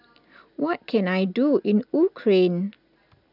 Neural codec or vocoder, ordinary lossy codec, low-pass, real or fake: none; none; 5.4 kHz; real